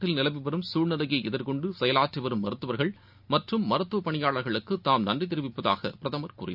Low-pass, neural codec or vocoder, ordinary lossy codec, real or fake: 5.4 kHz; none; none; real